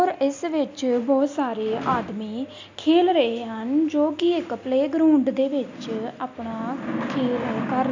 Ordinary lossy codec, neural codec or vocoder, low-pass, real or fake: MP3, 64 kbps; none; 7.2 kHz; real